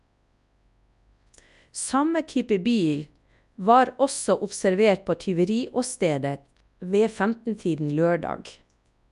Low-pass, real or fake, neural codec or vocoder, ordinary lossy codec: 10.8 kHz; fake; codec, 24 kHz, 0.9 kbps, WavTokenizer, large speech release; none